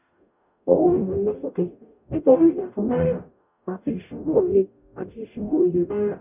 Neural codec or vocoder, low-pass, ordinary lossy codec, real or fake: codec, 44.1 kHz, 0.9 kbps, DAC; 3.6 kHz; none; fake